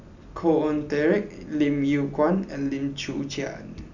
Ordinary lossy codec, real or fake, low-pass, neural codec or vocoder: none; real; 7.2 kHz; none